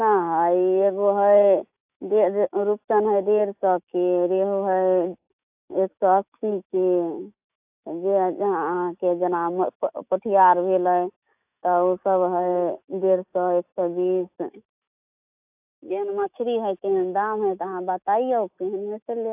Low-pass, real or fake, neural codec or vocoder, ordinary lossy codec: 3.6 kHz; fake; autoencoder, 48 kHz, 128 numbers a frame, DAC-VAE, trained on Japanese speech; AAC, 32 kbps